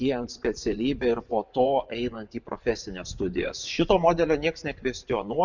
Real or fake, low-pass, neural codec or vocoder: fake; 7.2 kHz; vocoder, 24 kHz, 100 mel bands, Vocos